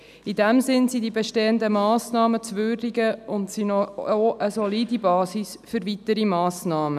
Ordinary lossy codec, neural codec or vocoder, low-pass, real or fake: none; none; 14.4 kHz; real